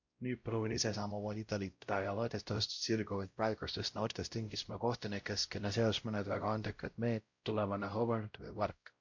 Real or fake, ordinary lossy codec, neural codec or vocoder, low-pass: fake; MP3, 48 kbps; codec, 16 kHz, 0.5 kbps, X-Codec, WavLM features, trained on Multilingual LibriSpeech; 7.2 kHz